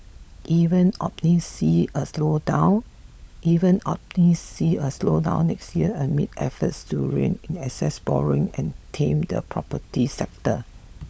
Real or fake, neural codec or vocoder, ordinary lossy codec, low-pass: fake; codec, 16 kHz, 16 kbps, FunCodec, trained on LibriTTS, 50 frames a second; none; none